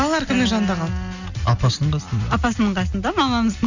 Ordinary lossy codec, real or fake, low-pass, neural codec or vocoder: none; real; 7.2 kHz; none